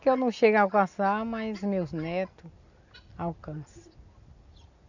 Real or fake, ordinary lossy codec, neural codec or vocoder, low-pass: real; none; none; 7.2 kHz